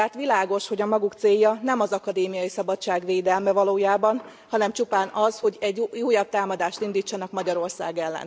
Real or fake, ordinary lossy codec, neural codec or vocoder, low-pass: real; none; none; none